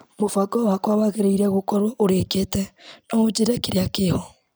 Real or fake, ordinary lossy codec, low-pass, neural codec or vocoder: real; none; none; none